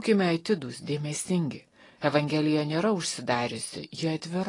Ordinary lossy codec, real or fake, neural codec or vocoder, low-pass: AAC, 32 kbps; real; none; 10.8 kHz